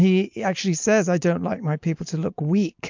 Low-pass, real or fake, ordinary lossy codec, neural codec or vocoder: 7.2 kHz; real; MP3, 64 kbps; none